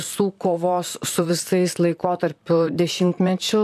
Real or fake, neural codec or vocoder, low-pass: fake; vocoder, 44.1 kHz, 128 mel bands, Pupu-Vocoder; 14.4 kHz